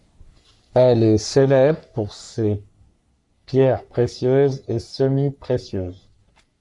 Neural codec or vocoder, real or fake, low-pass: codec, 44.1 kHz, 3.4 kbps, Pupu-Codec; fake; 10.8 kHz